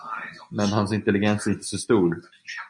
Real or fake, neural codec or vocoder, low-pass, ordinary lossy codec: real; none; 10.8 kHz; MP3, 64 kbps